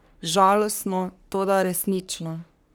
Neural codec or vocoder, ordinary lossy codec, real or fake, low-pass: codec, 44.1 kHz, 3.4 kbps, Pupu-Codec; none; fake; none